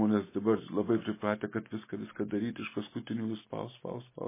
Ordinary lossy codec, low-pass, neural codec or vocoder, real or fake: MP3, 16 kbps; 3.6 kHz; none; real